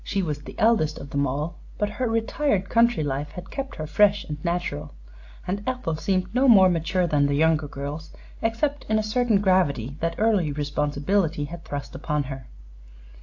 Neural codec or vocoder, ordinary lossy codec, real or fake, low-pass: none; AAC, 48 kbps; real; 7.2 kHz